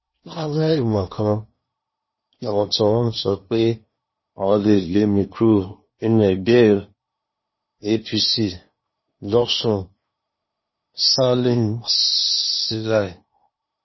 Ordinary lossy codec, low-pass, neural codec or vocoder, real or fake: MP3, 24 kbps; 7.2 kHz; codec, 16 kHz in and 24 kHz out, 0.8 kbps, FocalCodec, streaming, 65536 codes; fake